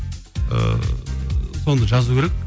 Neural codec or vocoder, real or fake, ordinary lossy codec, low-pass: none; real; none; none